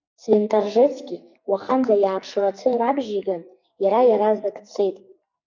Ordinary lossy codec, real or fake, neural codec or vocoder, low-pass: MP3, 64 kbps; fake; codec, 44.1 kHz, 2.6 kbps, SNAC; 7.2 kHz